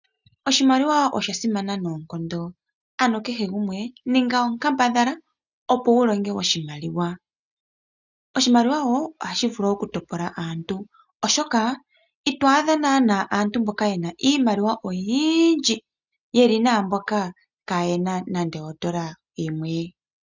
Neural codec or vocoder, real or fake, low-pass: none; real; 7.2 kHz